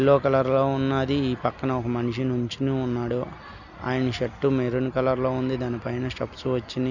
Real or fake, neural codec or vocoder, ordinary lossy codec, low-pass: real; none; none; 7.2 kHz